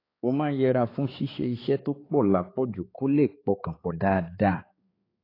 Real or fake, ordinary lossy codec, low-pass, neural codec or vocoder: fake; AAC, 24 kbps; 5.4 kHz; codec, 16 kHz, 4 kbps, X-Codec, HuBERT features, trained on balanced general audio